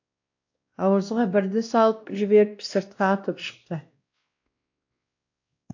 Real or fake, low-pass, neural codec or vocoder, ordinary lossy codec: fake; 7.2 kHz; codec, 16 kHz, 1 kbps, X-Codec, WavLM features, trained on Multilingual LibriSpeech; AAC, 48 kbps